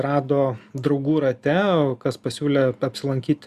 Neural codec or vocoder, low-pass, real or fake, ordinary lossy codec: none; 14.4 kHz; real; Opus, 64 kbps